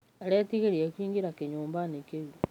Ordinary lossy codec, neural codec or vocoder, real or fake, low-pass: none; none; real; 19.8 kHz